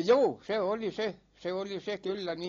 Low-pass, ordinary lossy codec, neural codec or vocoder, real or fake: 7.2 kHz; AAC, 32 kbps; none; real